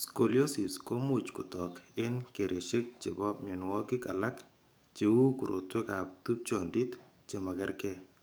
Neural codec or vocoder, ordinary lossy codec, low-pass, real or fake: codec, 44.1 kHz, 7.8 kbps, DAC; none; none; fake